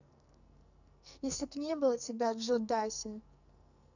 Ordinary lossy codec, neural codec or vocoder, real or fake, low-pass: none; codec, 44.1 kHz, 2.6 kbps, SNAC; fake; 7.2 kHz